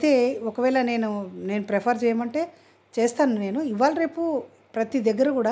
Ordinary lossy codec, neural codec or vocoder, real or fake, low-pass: none; none; real; none